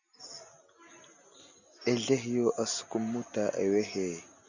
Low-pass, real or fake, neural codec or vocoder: 7.2 kHz; real; none